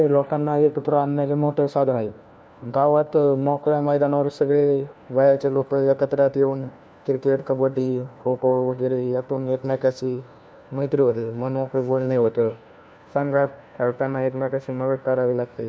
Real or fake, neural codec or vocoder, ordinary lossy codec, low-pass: fake; codec, 16 kHz, 1 kbps, FunCodec, trained on LibriTTS, 50 frames a second; none; none